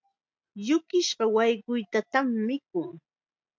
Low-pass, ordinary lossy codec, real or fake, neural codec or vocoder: 7.2 kHz; MP3, 64 kbps; real; none